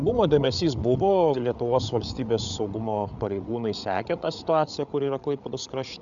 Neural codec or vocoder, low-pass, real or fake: codec, 16 kHz, 8 kbps, FreqCodec, larger model; 7.2 kHz; fake